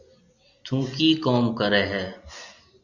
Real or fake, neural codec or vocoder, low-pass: real; none; 7.2 kHz